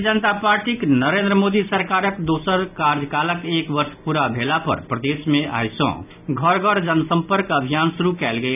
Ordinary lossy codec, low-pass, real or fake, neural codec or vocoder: none; 3.6 kHz; real; none